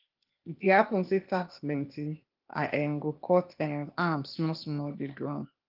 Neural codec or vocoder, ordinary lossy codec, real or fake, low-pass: codec, 16 kHz, 0.8 kbps, ZipCodec; Opus, 32 kbps; fake; 5.4 kHz